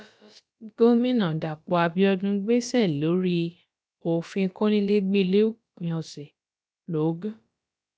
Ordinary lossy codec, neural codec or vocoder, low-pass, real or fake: none; codec, 16 kHz, about 1 kbps, DyCAST, with the encoder's durations; none; fake